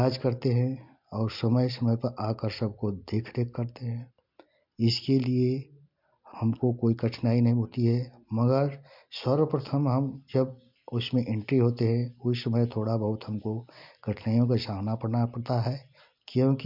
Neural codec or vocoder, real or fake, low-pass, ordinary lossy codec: none; real; 5.4 kHz; MP3, 48 kbps